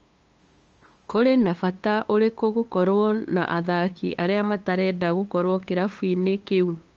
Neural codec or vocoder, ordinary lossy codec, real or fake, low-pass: codec, 16 kHz, 2 kbps, FunCodec, trained on LibriTTS, 25 frames a second; Opus, 24 kbps; fake; 7.2 kHz